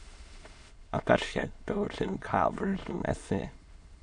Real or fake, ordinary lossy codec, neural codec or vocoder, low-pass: fake; MP3, 64 kbps; autoencoder, 22.05 kHz, a latent of 192 numbers a frame, VITS, trained on many speakers; 9.9 kHz